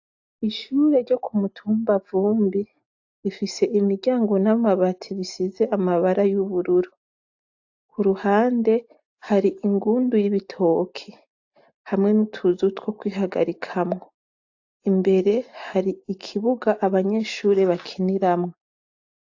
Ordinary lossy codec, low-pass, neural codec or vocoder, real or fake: AAC, 48 kbps; 7.2 kHz; none; real